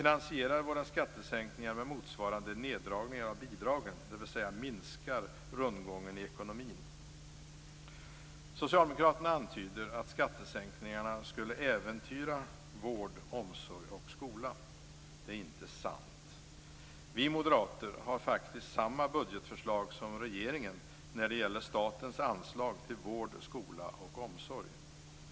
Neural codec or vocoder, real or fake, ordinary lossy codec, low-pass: none; real; none; none